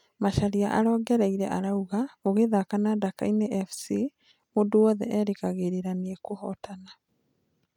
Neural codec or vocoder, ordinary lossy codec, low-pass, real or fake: none; none; 19.8 kHz; real